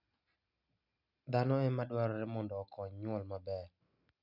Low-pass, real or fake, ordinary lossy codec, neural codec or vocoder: 5.4 kHz; real; none; none